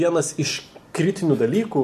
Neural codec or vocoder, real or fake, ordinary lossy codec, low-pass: none; real; AAC, 96 kbps; 14.4 kHz